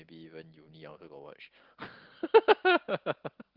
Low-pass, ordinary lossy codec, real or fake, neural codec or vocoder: 5.4 kHz; Opus, 16 kbps; real; none